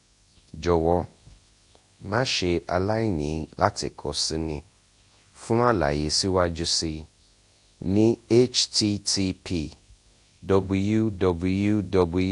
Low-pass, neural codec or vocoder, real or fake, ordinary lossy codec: 10.8 kHz; codec, 24 kHz, 0.9 kbps, WavTokenizer, large speech release; fake; AAC, 48 kbps